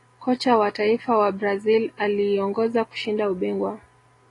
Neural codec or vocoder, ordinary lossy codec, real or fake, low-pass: none; AAC, 32 kbps; real; 10.8 kHz